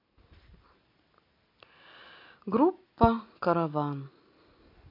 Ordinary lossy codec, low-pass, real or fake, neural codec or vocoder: MP3, 32 kbps; 5.4 kHz; real; none